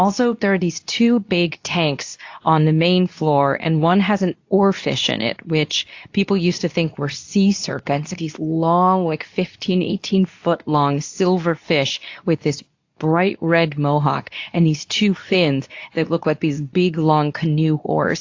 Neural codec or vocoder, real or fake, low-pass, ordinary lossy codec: codec, 24 kHz, 0.9 kbps, WavTokenizer, medium speech release version 2; fake; 7.2 kHz; AAC, 48 kbps